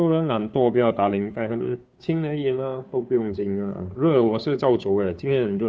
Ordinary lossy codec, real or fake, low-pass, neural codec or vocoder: none; fake; none; codec, 16 kHz, 2 kbps, FunCodec, trained on Chinese and English, 25 frames a second